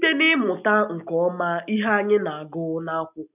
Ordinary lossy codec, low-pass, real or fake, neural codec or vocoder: none; 3.6 kHz; real; none